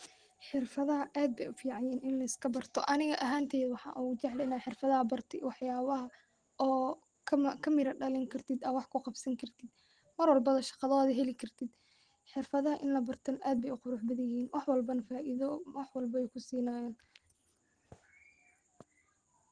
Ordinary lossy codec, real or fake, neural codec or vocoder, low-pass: Opus, 16 kbps; real; none; 9.9 kHz